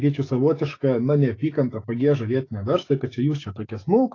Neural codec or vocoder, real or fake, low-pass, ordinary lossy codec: autoencoder, 48 kHz, 128 numbers a frame, DAC-VAE, trained on Japanese speech; fake; 7.2 kHz; AAC, 32 kbps